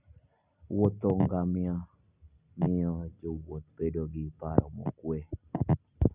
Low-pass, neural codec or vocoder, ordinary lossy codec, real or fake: 3.6 kHz; none; Opus, 64 kbps; real